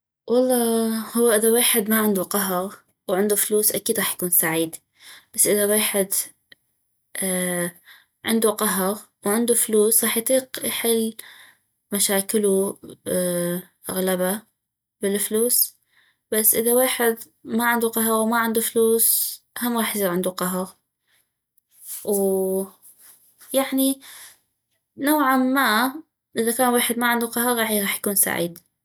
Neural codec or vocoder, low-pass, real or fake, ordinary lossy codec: none; none; real; none